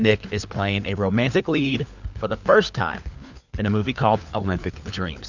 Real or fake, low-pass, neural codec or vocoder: fake; 7.2 kHz; codec, 16 kHz, 4 kbps, FunCodec, trained on LibriTTS, 50 frames a second